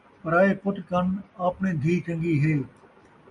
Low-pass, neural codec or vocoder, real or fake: 10.8 kHz; none; real